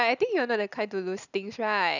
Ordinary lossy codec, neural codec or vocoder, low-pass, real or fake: none; none; 7.2 kHz; real